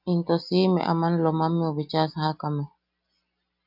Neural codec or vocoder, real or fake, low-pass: none; real; 5.4 kHz